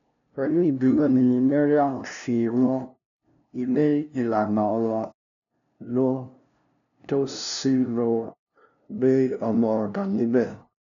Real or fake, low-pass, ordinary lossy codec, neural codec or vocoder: fake; 7.2 kHz; none; codec, 16 kHz, 0.5 kbps, FunCodec, trained on LibriTTS, 25 frames a second